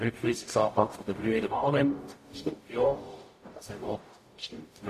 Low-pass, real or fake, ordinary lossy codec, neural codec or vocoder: 14.4 kHz; fake; AAC, 48 kbps; codec, 44.1 kHz, 0.9 kbps, DAC